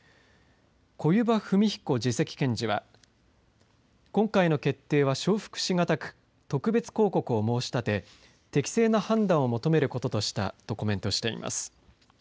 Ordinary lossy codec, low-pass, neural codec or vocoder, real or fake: none; none; none; real